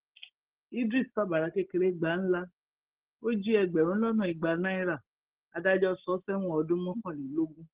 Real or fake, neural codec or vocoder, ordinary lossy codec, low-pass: real; none; Opus, 16 kbps; 3.6 kHz